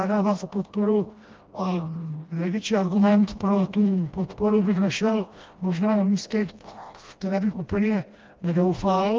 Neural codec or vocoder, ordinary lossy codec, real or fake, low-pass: codec, 16 kHz, 1 kbps, FreqCodec, smaller model; Opus, 24 kbps; fake; 7.2 kHz